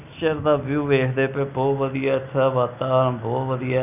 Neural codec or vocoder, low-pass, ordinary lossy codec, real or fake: none; 3.6 kHz; none; real